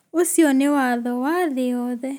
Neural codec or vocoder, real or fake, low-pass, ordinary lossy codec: none; real; none; none